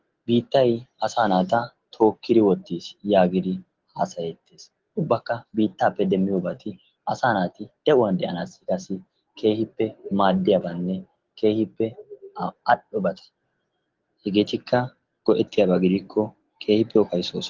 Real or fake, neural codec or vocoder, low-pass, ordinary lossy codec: real; none; 7.2 kHz; Opus, 16 kbps